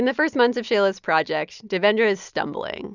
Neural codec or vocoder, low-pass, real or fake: none; 7.2 kHz; real